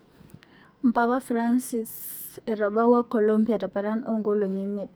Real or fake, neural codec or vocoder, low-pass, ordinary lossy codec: fake; codec, 44.1 kHz, 2.6 kbps, SNAC; none; none